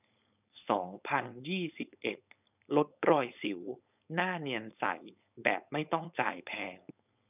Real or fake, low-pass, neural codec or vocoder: fake; 3.6 kHz; codec, 16 kHz, 4.8 kbps, FACodec